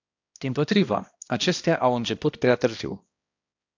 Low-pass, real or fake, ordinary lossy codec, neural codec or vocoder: 7.2 kHz; fake; AAC, 48 kbps; codec, 16 kHz, 1 kbps, X-Codec, HuBERT features, trained on balanced general audio